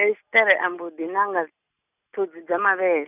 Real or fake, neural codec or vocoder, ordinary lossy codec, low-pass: real; none; none; 3.6 kHz